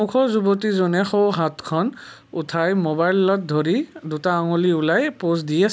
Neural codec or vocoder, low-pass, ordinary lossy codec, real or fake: none; none; none; real